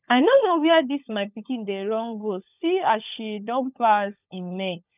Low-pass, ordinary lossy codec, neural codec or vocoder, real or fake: 3.6 kHz; none; codec, 16 kHz, 16 kbps, FunCodec, trained on LibriTTS, 50 frames a second; fake